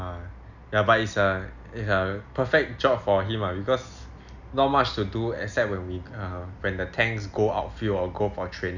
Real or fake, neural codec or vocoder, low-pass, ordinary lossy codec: real; none; 7.2 kHz; none